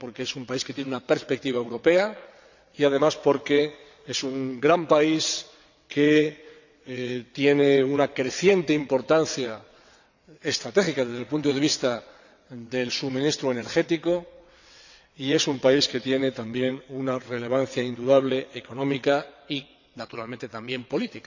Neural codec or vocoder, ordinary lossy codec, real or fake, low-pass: vocoder, 22.05 kHz, 80 mel bands, WaveNeXt; none; fake; 7.2 kHz